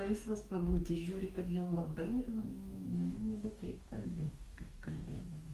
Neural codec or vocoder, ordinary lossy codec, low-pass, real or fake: codec, 44.1 kHz, 2.6 kbps, DAC; Opus, 32 kbps; 14.4 kHz; fake